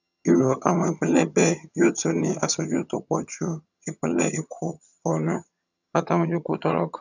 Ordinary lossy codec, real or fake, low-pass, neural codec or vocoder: none; fake; 7.2 kHz; vocoder, 22.05 kHz, 80 mel bands, HiFi-GAN